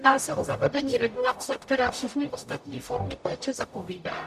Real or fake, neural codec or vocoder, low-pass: fake; codec, 44.1 kHz, 0.9 kbps, DAC; 14.4 kHz